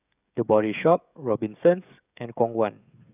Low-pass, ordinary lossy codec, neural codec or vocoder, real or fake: 3.6 kHz; none; codec, 16 kHz, 16 kbps, FreqCodec, smaller model; fake